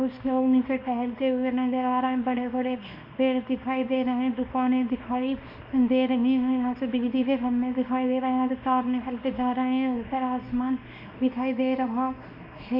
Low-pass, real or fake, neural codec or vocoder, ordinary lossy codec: 5.4 kHz; fake; codec, 24 kHz, 0.9 kbps, WavTokenizer, small release; none